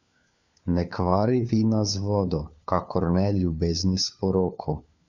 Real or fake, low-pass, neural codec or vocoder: fake; 7.2 kHz; codec, 16 kHz, 4 kbps, FunCodec, trained on LibriTTS, 50 frames a second